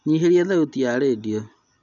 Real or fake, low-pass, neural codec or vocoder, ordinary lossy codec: real; 7.2 kHz; none; none